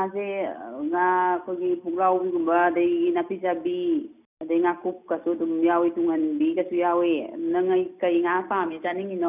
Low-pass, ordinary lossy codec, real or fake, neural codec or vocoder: 3.6 kHz; none; real; none